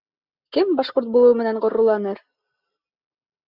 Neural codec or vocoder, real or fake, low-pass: none; real; 5.4 kHz